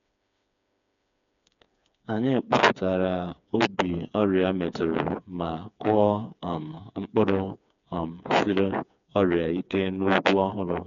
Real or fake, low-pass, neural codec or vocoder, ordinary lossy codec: fake; 7.2 kHz; codec, 16 kHz, 4 kbps, FreqCodec, smaller model; MP3, 96 kbps